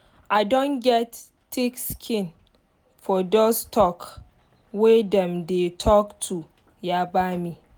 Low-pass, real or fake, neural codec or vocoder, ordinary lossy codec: none; real; none; none